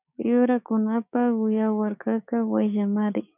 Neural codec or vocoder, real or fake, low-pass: codec, 16 kHz, 6 kbps, DAC; fake; 3.6 kHz